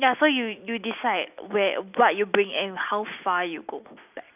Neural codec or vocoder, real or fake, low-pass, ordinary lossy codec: none; real; 3.6 kHz; none